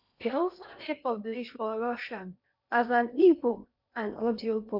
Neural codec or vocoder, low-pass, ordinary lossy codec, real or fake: codec, 16 kHz in and 24 kHz out, 0.8 kbps, FocalCodec, streaming, 65536 codes; 5.4 kHz; none; fake